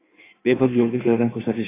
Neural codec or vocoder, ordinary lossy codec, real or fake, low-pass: codec, 16 kHz in and 24 kHz out, 1.1 kbps, FireRedTTS-2 codec; AAC, 16 kbps; fake; 3.6 kHz